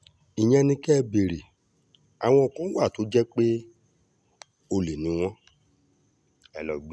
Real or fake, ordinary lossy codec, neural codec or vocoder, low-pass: real; none; none; none